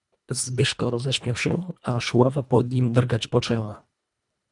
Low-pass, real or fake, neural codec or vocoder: 10.8 kHz; fake; codec, 24 kHz, 1.5 kbps, HILCodec